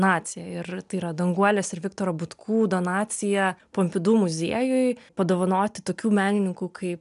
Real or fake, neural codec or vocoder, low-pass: real; none; 10.8 kHz